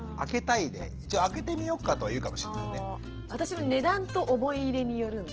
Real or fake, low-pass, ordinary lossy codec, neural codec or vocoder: real; 7.2 kHz; Opus, 16 kbps; none